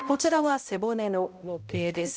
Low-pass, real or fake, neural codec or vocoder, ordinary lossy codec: none; fake; codec, 16 kHz, 0.5 kbps, X-Codec, HuBERT features, trained on balanced general audio; none